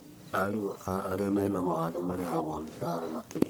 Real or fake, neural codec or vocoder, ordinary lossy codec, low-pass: fake; codec, 44.1 kHz, 1.7 kbps, Pupu-Codec; none; none